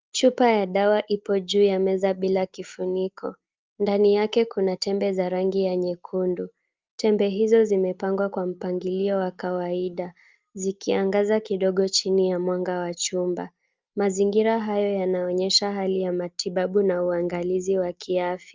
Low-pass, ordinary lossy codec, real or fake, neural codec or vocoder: 7.2 kHz; Opus, 24 kbps; real; none